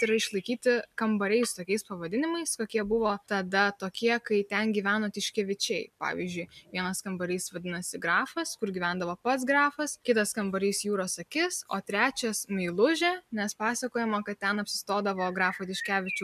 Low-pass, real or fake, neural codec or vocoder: 14.4 kHz; real; none